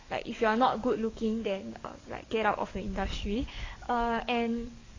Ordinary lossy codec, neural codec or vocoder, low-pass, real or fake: AAC, 32 kbps; codec, 44.1 kHz, 7.8 kbps, DAC; 7.2 kHz; fake